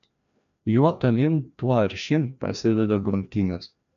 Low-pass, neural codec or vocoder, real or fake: 7.2 kHz; codec, 16 kHz, 1 kbps, FreqCodec, larger model; fake